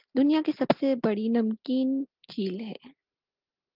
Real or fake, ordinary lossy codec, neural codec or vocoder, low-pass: real; Opus, 32 kbps; none; 5.4 kHz